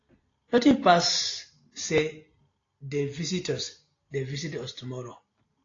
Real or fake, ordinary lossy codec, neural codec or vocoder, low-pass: real; AAC, 32 kbps; none; 7.2 kHz